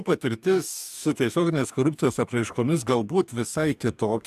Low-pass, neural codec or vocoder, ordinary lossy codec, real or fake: 14.4 kHz; codec, 44.1 kHz, 2.6 kbps, DAC; AAC, 96 kbps; fake